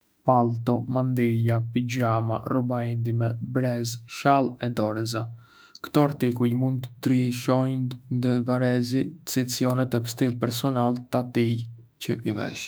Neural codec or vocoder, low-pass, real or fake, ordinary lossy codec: autoencoder, 48 kHz, 32 numbers a frame, DAC-VAE, trained on Japanese speech; none; fake; none